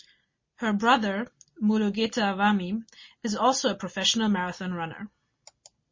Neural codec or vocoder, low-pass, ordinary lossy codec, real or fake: none; 7.2 kHz; MP3, 32 kbps; real